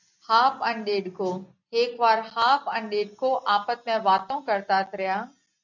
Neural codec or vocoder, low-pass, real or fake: none; 7.2 kHz; real